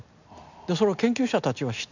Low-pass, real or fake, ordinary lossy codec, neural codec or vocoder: 7.2 kHz; real; none; none